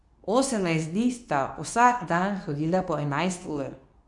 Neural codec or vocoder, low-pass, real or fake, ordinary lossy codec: codec, 24 kHz, 0.9 kbps, WavTokenizer, medium speech release version 2; 10.8 kHz; fake; none